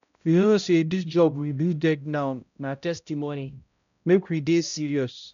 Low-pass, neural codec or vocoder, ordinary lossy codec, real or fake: 7.2 kHz; codec, 16 kHz, 0.5 kbps, X-Codec, HuBERT features, trained on balanced general audio; none; fake